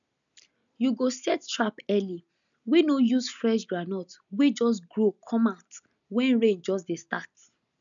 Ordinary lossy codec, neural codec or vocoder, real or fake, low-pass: none; none; real; 7.2 kHz